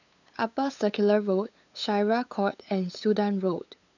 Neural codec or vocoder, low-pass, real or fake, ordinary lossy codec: codec, 16 kHz, 8 kbps, FunCodec, trained on LibriTTS, 25 frames a second; 7.2 kHz; fake; none